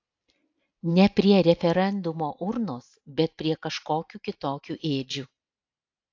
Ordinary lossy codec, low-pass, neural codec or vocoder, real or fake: AAC, 48 kbps; 7.2 kHz; none; real